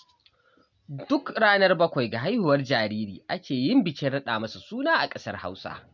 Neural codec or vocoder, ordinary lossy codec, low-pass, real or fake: none; none; 7.2 kHz; real